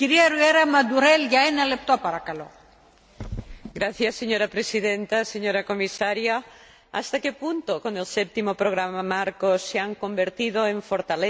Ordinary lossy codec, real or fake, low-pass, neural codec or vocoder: none; real; none; none